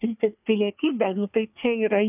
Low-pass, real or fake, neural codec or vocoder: 3.6 kHz; fake; codec, 24 kHz, 1 kbps, SNAC